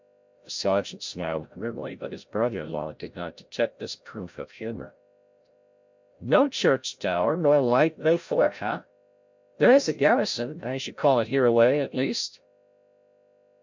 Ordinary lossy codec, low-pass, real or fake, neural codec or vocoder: MP3, 64 kbps; 7.2 kHz; fake; codec, 16 kHz, 0.5 kbps, FreqCodec, larger model